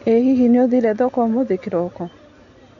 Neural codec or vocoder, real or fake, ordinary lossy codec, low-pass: none; real; none; 7.2 kHz